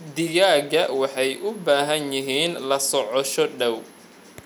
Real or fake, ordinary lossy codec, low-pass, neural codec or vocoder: real; none; 19.8 kHz; none